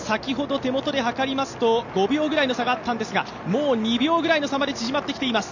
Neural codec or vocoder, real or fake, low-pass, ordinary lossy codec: none; real; 7.2 kHz; none